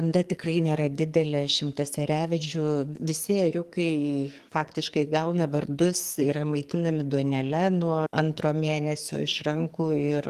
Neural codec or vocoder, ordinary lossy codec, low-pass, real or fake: codec, 44.1 kHz, 2.6 kbps, SNAC; Opus, 24 kbps; 14.4 kHz; fake